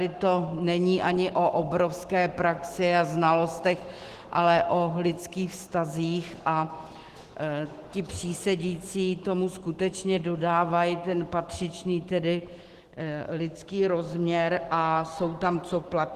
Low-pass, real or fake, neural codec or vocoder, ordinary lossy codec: 14.4 kHz; fake; autoencoder, 48 kHz, 128 numbers a frame, DAC-VAE, trained on Japanese speech; Opus, 16 kbps